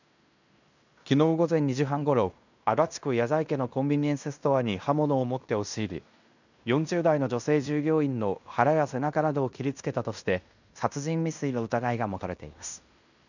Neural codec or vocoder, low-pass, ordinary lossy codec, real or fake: codec, 16 kHz in and 24 kHz out, 0.9 kbps, LongCat-Audio-Codec, fine tuned four codebook decoder; 7.2 kHz; none; fake